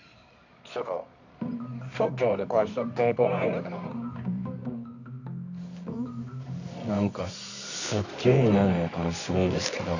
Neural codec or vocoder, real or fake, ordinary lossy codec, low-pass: codec, 24 kHz, 0.9 kbps, WavTokenizer, medium music audio release; fake; none; 7.2 kHz